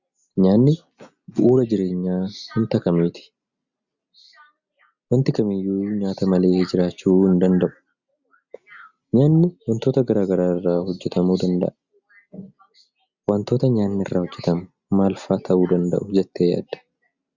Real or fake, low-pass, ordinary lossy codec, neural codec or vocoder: real; 7.2 kHz; Opus, 64 kbps; none